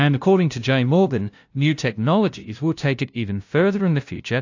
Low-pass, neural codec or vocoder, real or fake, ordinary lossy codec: 7.2 kHz; codec, 16 kHz, 0.5 kbps, FunCodec, trained on LibriTTS, 25 frames a second; fake; AAC, 48 kbps